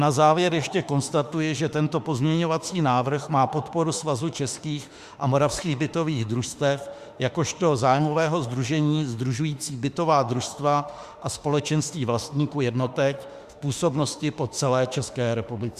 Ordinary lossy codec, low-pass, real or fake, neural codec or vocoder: Opus, 64 kbps; 14.4 kHz; fake; autoencoder, 48 kHz, 32 numbers a frame, DAC-VAE, trained on Japanese speech